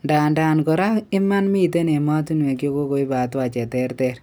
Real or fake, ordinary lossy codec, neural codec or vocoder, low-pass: real; none; none; none